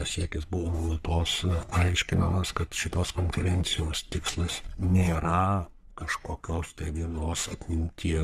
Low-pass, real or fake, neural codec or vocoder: 14.4 kHz; fake; codec, 44.1 kHz, 3.4 kbps, Pupu-Codec